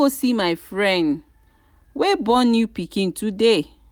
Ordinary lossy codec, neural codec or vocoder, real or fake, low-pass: none; none; real; none